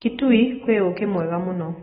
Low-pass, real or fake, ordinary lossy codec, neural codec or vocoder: 7.2 kHz; real; AAC, 16 kbps; none